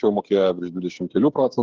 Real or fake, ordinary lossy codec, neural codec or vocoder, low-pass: fake; Opus, 16 kbps; codec, 16 kHz, 8 kbps, FreqCodec, smaller model; 7.2 kHz